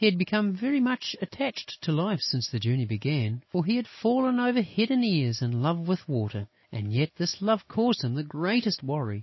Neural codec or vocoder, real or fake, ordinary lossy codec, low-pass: none; real; MP3, 24 kbps; 7.2 kHz